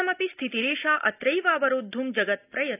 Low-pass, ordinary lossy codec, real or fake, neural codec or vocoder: 3.6 kHz; none; real; none